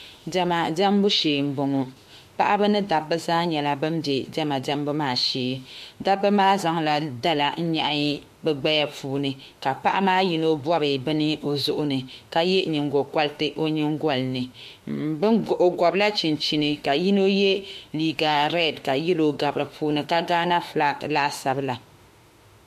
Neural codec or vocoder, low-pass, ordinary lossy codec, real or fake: autoencoder, 48 kHz, 32 numbers a frame, DAC-VAE, trained on Japanese speech; 14.4 kHz; MP3, 64 kbps; fake